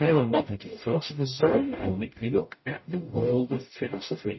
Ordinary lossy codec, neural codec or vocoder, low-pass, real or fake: MP3, 24 kbps; codec, 44.1 kHz, 0.9 kbps, DAC; 7.2 kHz; fake